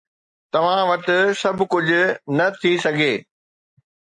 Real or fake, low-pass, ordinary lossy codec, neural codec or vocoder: real; 10.8 kHz; MP3, 48 kbps; none